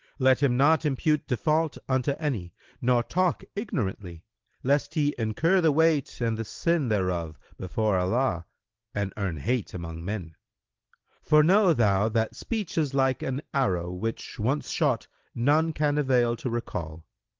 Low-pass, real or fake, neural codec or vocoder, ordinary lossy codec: 7.2 kHz; real; none; Opus, 16 kbps